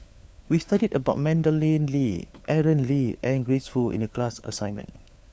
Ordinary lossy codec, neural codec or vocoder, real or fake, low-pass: none; codec, 16 kHz, 4 kbps, FunCodec, trained on LibriTTS, 50 frames a second; fake; none